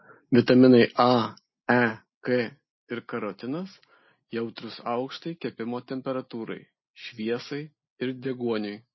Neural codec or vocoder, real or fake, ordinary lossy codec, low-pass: none; real; MP3, 24 kbps; 7.2 kHz